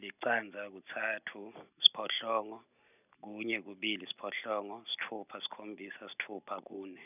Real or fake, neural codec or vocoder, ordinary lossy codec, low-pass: real; none; none; 3.6 kHz